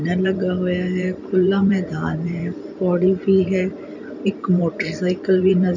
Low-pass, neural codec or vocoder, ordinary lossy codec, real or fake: 7.2 kHz; vocoder, 44.1 kHz, 128 mel bands every 512 samples, BigVGAN v2; none; fake